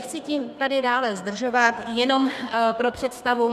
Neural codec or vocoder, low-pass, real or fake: codec, 32 kHz, 1.9 kbps, SNAC; 14.4 kHz; fake